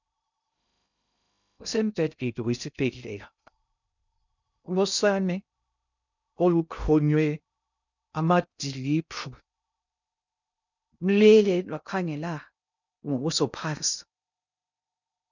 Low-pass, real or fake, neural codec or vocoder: 7.2 kHz; fake; codec, 16 kHz in and 24 kHz out, 0.6 kbps, FocalCodec, streaming, 2048 codes